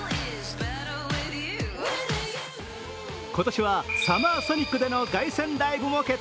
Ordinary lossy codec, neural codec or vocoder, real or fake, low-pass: none; none; real; none